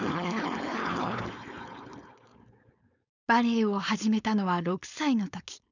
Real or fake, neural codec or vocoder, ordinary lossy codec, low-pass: fake; codec, 16 kHz, 4.8 kbps, FACodec; none; 7.2 kHz